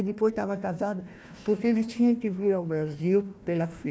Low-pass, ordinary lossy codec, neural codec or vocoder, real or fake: none; none; codec, 16 kHz, 1 kbps, FunCodec, trained on Chinese and English, 50 frames a second; fake